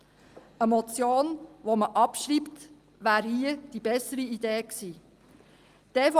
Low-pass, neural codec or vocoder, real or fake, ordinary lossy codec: 14.4 kHz; none; real; Opus, 32 kbps